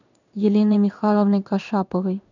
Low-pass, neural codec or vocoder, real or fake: 7.2 kHz; codec, 16 kHz in and 24 kHz out, 1 kbps, XY-Tokenizer; fake